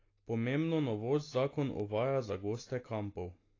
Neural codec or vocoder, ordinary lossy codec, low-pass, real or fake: none; AAC, 32 kbps; 7.2 kHz; real